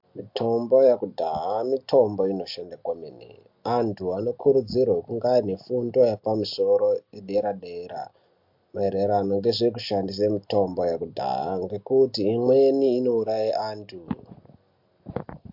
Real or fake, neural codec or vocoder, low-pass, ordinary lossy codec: real; none; 5.4 kHz; MP3, 48 kbps